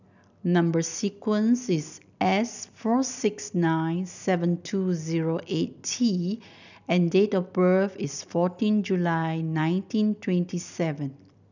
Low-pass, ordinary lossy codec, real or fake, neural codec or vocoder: 7.2 kHz; none; real; none